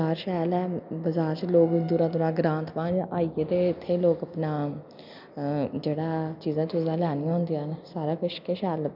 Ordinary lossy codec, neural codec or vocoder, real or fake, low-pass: none; none; real; 5.4 kHz